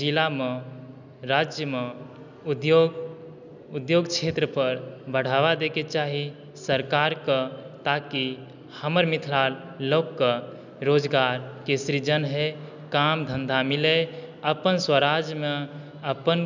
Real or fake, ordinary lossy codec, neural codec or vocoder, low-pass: real; none; none; 7.2 kHz